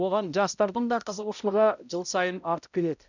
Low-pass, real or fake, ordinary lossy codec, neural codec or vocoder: 7.2 kHz; fake; none; codec, 16 kHz, 0.5 kbps, X-Codec, HuBERT features, trained on balanced general audio